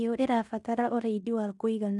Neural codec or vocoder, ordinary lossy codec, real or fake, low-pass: codec, 16 kHz in and 24 kHz out, 0.9 kbps, LongCat-Audio-Codec, fine tuned four codebook decoder; MP3, 96 kbps; fake; 10.8 kHz